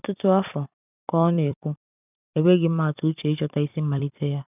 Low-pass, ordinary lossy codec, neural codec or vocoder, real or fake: 3.6 kHz; none; vocoder, 44.1 kHz, 128 mel bands every 256 samples, BigVGAN v2; fake